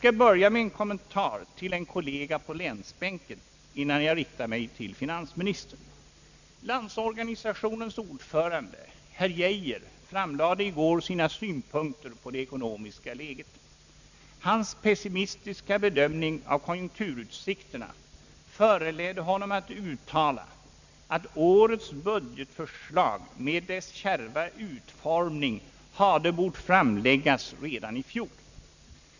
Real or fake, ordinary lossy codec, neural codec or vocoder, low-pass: real; none; none; 7.2 kHz